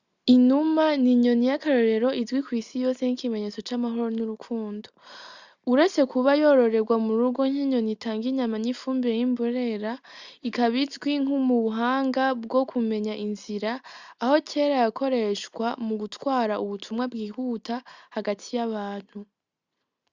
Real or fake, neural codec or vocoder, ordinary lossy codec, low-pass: real; none; Opus, 64 kbps; 7.2 kHz